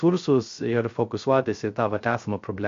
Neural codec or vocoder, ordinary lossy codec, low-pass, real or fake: codec, 16 kHz, 0.3 kbps, FocalCodec; MP3, 48 kbps; 7.2 kHz; fake